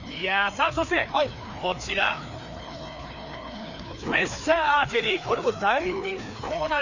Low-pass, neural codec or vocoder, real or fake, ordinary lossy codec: 7.2 kHz; codec, 16 kHz, 2 kbps, FreqCodec, larger model; fake; none